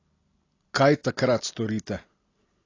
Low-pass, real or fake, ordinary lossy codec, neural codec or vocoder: 7.2 kHz; real; AAC, 32 kbps; none